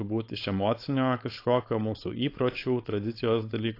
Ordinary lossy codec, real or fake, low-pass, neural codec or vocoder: AAC, 32 kbps; fake; 5.4 kHz; codec, 16 kHz, 4.8 kbps, FACodec